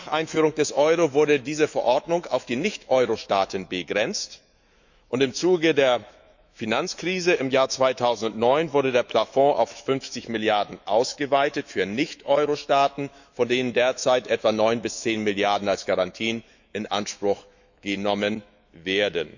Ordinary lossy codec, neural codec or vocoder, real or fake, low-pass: none; autoencoder, 48 kHz, 128 numbers a frame, DAC-VAE, trained on Japanese speech; fake; 7.2 kHz